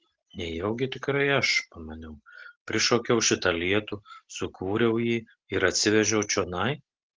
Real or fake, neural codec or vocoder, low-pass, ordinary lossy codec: real; none; 7.2 kHz; Opus, 16 kbps